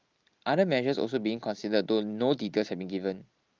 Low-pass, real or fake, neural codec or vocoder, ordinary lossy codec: 7.2 kHz; real; none; Opus, 32 kbps